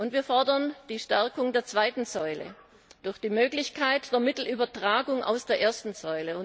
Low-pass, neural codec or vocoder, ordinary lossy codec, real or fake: none; none; none; real